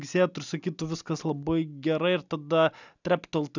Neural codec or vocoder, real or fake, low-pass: none; real; 7.2 kHz